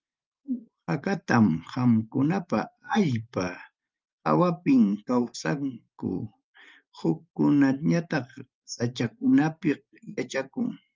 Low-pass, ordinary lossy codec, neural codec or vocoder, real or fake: 7.2 kHz; Opus, 24 kbps; vocoder, 44.1 kHz, 128 mel bands every 512 samples, BigVGAN v2; fake